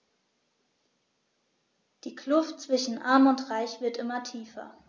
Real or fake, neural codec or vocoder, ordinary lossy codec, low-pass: real; none; none; none